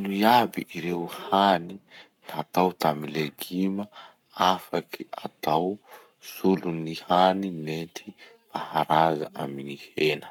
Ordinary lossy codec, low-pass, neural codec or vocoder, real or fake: none; 19.8 kHz; codec, 44.1 kHz, 7.8 kbps, Pupu-Codec; fake